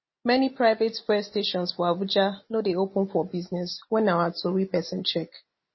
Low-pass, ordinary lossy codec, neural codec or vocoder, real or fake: 7.2 kHz; MP3, 24 kbps; none; real